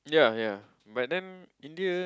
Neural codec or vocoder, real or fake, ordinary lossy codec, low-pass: none; real; none; none